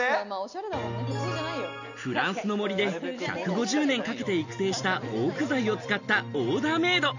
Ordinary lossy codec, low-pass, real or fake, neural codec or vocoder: none; 7.2 kHz; real; none